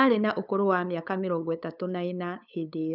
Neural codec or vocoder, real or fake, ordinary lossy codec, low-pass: codec, 16 kHz, 8 kbps, FunCodec, trained on LibriTTS, 25 frames a second; fake; none; 5.4 kHz